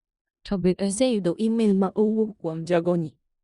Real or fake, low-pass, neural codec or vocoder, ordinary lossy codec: fake; 10.8 kHz; codec, 16 kHz in and 24 kHz out, 0.4 kbps, LongCat-Audio-Codec, four codebook decoder; Opus, 64 kbps